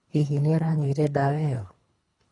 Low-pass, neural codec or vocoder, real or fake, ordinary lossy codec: 10.8 kHz; codec, 24 kHz, 3 kbps, HILCodec; fake; AAC, 32 kbps